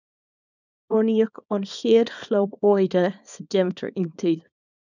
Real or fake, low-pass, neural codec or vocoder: fake; 7.2 kHz; codec, 24 kHz, 0.9 kbps, WavTokenizer, small release